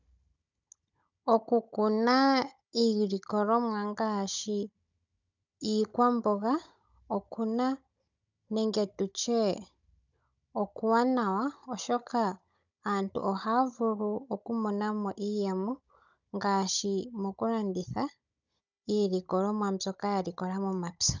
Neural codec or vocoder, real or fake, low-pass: codec, 16 kHz, 16 kbps, FunCodec, trained on Chinese and English, 50 frames a second; fake; 7.2 kHz